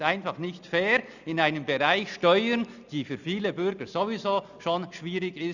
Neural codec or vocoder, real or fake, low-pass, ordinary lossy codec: none; real; 7.2 kHz; none